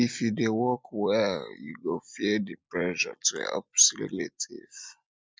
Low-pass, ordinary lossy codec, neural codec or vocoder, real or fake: none; none; none; real